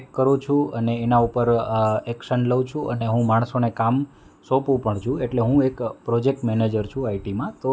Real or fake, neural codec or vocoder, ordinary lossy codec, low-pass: real; none; none; none